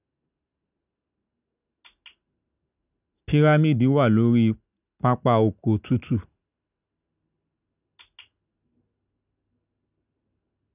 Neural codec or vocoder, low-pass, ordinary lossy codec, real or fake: none; 3.6 kHz; none; real